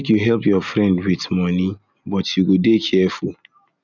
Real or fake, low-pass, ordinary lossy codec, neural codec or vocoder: real; 7.2 kHz; none; none